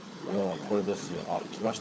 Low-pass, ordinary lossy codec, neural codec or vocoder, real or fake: none; none; codec, 16 kHz, 16 kbps, FunCodec, trained on LibriTTS, 50 frames a second; fake